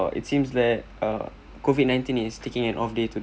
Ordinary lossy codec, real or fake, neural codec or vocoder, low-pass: none; real; none; none